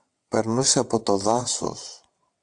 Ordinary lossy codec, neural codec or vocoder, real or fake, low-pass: AAC, 64 kbps; vocoder, 22.05 kHz, 80 mel bands, WaveNeXt; fake; 9.9 kHz